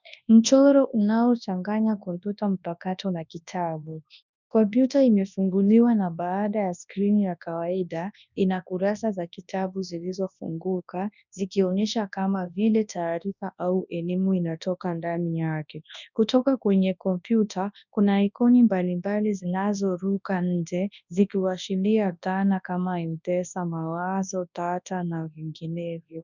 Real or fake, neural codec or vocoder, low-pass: fake; codec, 24 kHz, 0.9 kbps, WavTokenizer, large speech release; 7.2 kHz